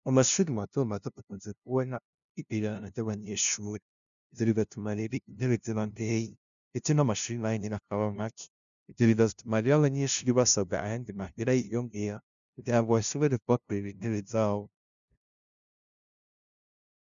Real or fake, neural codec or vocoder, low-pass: fake; codec, 16 kHz, 0.5 kbps, FunCodec, trained on LibriTTS, 25 frames a second; 7.2 kHz